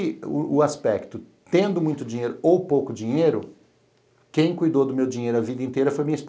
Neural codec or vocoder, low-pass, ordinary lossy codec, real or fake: none; none; none; real